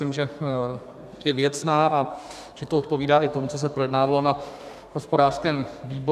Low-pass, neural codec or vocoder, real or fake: 14.4 kHz; codec, 32 kHz, 1.9 kbps, SNAC; fake